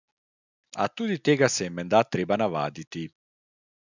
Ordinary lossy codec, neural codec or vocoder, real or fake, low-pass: none; none; real; 7.2 kHz